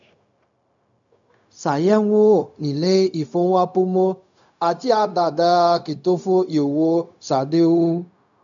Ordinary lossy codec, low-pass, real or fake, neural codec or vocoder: none; 7.2 kHz; fake; codec, 16 kHz, 0.4 kbps, LongCat-Audio-Codec